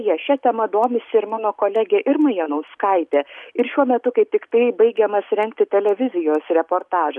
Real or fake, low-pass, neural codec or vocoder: real; 10.8 kHz; none